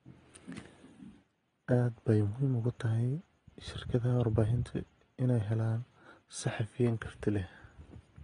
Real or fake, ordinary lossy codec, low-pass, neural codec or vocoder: real; AAC, 32 kbps; 9.9 kHz; none